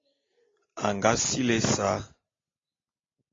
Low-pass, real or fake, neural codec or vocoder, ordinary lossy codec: 7.2 kHz; real; none; AAC, 32 kbps